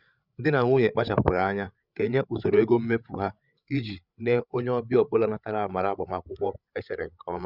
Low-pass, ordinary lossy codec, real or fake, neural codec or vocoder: 5.4 kHz; Opus, 64 kbps; fake; codec, 16 kHz, 8 kbps, FreqCodec, larger model